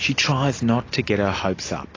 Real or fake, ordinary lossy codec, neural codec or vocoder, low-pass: real; AAC, 32 kbps; none; 7.2 kHz